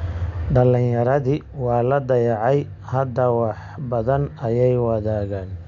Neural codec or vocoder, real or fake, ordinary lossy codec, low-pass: none; real; none; 7.2 kHz